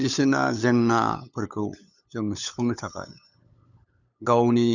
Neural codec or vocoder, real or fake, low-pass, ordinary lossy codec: codec, 16 kHz, 8 kbps, FunCodec, trained on LibriTTS, 25 frames a second; fake; 7.2 kHz; none